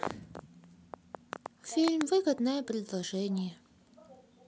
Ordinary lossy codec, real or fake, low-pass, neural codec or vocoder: none; real; none; none